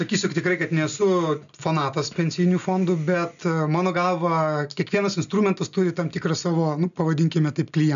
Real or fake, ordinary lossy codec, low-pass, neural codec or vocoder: real; MP3, 96 kbps; 7.2 kHz; none